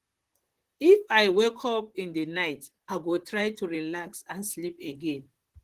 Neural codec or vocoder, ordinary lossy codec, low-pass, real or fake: vocoder, 44.1 kHz, 128 mel bands, Pupu-Vocoder; Opus, 16 kbps; 14.4 kHz; fake